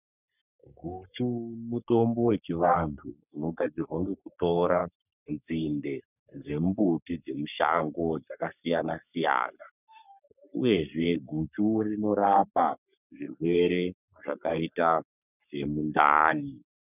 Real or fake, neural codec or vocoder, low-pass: fake; codec, 44.1 kHz, 3.4 kbps, Pupu-Codec; 3.6 kHz